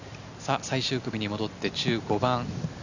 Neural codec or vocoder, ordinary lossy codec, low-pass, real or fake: none; none; 7.2 kHz; real